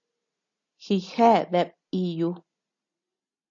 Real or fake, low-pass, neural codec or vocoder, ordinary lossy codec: real; 7.2 kHz; none; AAC, 48 kbps